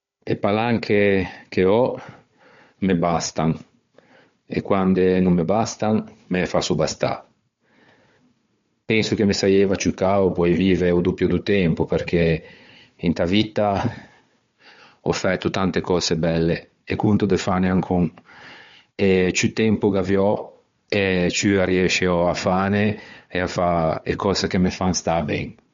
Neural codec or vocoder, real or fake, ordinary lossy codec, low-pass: codec, 16 kHz, 4 kbps, FunCodec, trained on Chinese and English, 50 frames a second; fake; MP3, 48 kbps; 7.2 kHz